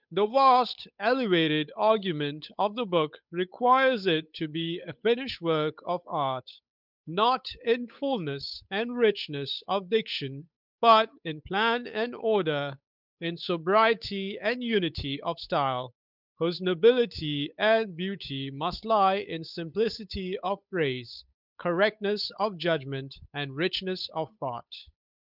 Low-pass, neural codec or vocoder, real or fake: 5.4 kHz; codec, 16 kHz, 8 kbps, FunCodec, trained on Chinese and English, 25 frames a second; fake